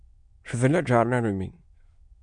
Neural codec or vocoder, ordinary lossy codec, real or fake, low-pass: autoencoder, 22.05 kHz, a latent of 192 numbers a frame, VITS, trained on many speakers; MP3, 64 kbps; fake; 9.9 kHz